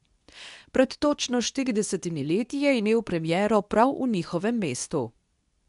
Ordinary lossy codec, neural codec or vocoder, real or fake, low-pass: none; codec, 24 kHz, 0.9 kbps, WavTokenizer, medium speech release version 2; fake; 10.8 kHz